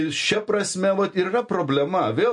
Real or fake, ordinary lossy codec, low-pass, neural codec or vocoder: real; MP3, 48 kbps; 10.8 kHz; none